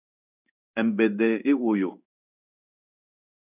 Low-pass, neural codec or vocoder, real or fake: 3.6 kHz; codec, 16 kHz in and 24 kHz out, 1 kbps, XY-Tokenizer; fake